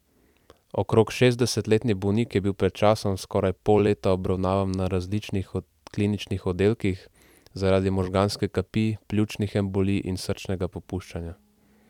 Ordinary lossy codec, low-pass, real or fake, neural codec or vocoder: none; 19.8 kHz; fake; vocoder, 44.1 kHz, 128 mel bands every 256 samples, BigVGAN v2